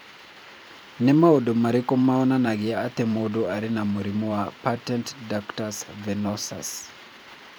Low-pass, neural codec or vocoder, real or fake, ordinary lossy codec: none; vocoder, 44.1 kHz, 128 mel bands every 512 samples, BigVGAN v2; fake; none